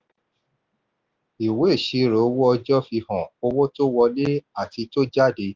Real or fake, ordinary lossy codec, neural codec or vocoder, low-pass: real; Opus, 32 kbps; none; 7.2 kHz